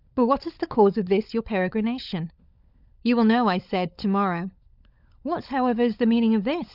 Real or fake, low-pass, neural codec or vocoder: fake; 5.4 kHz; codec, 16 kHz, 16 kbps, FunCodec, trained on LibriTTS, 50 frames a second